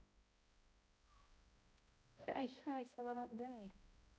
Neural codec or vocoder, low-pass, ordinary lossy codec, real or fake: codec, 16 kHz, 0.5 kbps, X-Codec, HuBERT features, trained on balanced general audio; none; none; fake